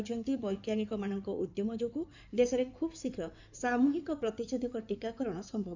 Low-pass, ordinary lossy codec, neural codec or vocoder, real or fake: 7.2 kHz; none; codec, 16 kHz in and 24 kHz out, 2.2 kbps, FireRedTTS-2 codec; fake